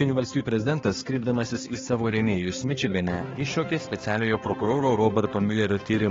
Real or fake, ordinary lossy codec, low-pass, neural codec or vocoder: fake; AAC, 24 kbps; 7.2 kHz; codec, 16 kHz, 2 kbps, X-Codec, HuBERT features, trained on balanced general audio